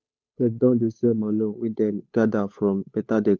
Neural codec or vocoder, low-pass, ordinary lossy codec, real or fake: codec, 16 kHz, 8 kbps, FunCodec, trained on Chinese and English, 25 frames a second; none; none; fake